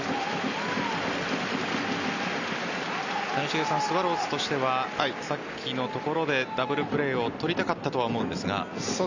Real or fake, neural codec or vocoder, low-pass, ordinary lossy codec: real; none; 7.2 kHz; Opus, 64 kbps